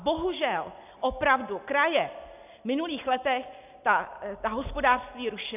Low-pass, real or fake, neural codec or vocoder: 3.6 kHz; real; none